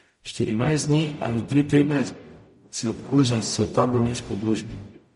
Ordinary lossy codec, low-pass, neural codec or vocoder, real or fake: MP3, 48 kbps; 19.8 kHz; codec, 44.1 kHz, 0.9 kbps, DAC; fake